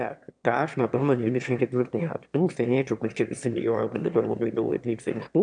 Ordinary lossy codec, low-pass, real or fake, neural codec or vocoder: MP3, 96 kbps; 9.9 kHz; fake; autoencoder, 22.05 kHz, a latent of 192 numbers a frame, VITS, trained on one speaker